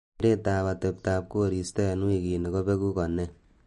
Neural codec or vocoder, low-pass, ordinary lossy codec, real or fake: none; 14.4 kHz; MP3, 48 kbps; real